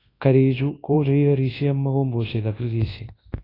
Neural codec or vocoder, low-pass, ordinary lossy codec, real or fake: codec, 24 kHz, 0.9 kbps, WavTokenizer, large speech release; 5.4 kHz; AAC, 24 kbps; fake